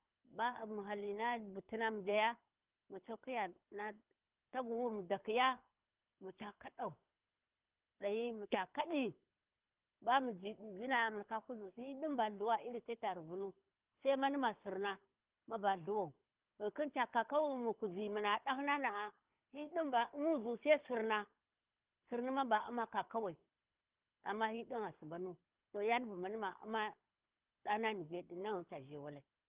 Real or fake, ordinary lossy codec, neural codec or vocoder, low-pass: fake; Opus, 32 kbps; codec, 24 kHz, 6 kbps, HILCodec; 3.6 kHz